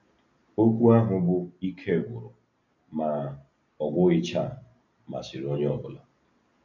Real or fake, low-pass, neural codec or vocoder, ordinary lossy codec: real; 7.2 kHz; none; none